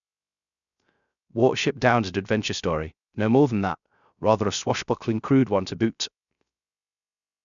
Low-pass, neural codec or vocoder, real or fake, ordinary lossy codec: 7.2 kHz; codec, 16 kHz, 0.3 kbps, FocalCodec; fake; none